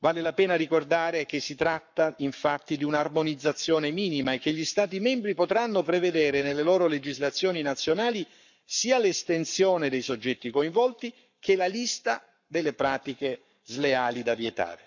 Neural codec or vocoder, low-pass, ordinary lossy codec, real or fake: codec, 44.1 kHz, 7.8 kbps, Pupu-Codec; 7.2 kHz; none; fake